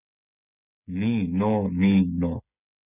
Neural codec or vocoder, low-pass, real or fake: codec, 16 kHz, 8 kbps, FreqCodec, smaller model; 3.6 kHz; fake